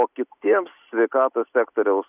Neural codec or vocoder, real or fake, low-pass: none; real; 3.6 kHz